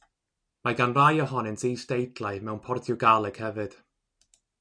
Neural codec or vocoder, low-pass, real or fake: none; 9.9 kHz; real